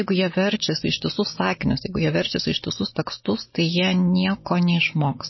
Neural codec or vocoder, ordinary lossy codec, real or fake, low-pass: none; MP3, 24 kbps; real; 7.2 kHz